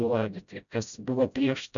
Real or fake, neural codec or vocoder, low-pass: fake; codec, 16 kHz, 0.5 kbps, FreqCodec, smaller model; 7.2 kHz